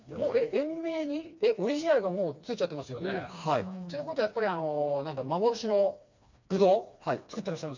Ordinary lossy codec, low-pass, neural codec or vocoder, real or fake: MP3, 64 kbps; 7.2 kHz; codec, 16 kHz, 2 kbps, FreqCodec, smaller model; fake